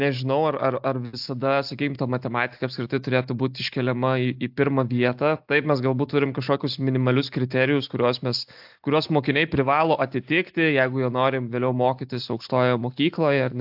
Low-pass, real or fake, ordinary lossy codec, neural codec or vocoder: 5.4 kHz; real; AAC, 48 kbps; none